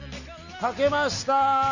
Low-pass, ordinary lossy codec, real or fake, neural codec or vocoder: 7.2 kHz; none; real; none